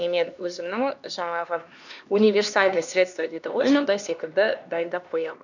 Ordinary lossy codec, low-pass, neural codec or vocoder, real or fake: none; 7.2 kHz; codec, 16 kHz, 2 kbps, X-Codec, HuBERT features, trained on LibriSpeech; fake